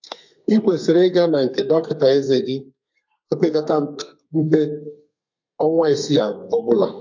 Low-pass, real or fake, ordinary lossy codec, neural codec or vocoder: 7.2 kHz; fake; MP3, 48 kbps; codec, 32 kHz, 1.9 kbps, SNAC